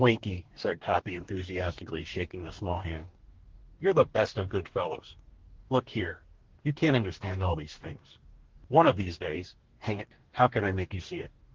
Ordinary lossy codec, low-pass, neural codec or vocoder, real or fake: Opus, 16 kbps; 7.2 kHz; codec, 44.1 kHz, 2.6 kbps, DAC; fake